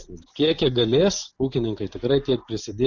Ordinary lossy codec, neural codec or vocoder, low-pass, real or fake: Opus, 64 kbps; none; 7.2 kHz; real